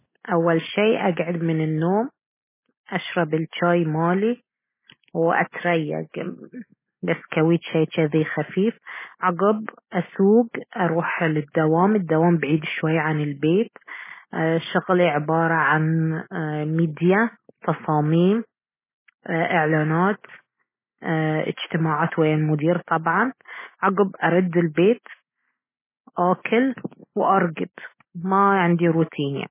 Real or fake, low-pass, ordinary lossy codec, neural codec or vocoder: real; 3.6 kHz; MP3, 16 kbps; none